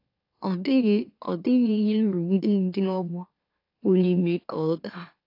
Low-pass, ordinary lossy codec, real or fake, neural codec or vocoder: 5.4 kHz; AAC, 32 kbps; fake; autoencoder, 44.1 kHz, a latent of 192 numbers a frame, MeloTTS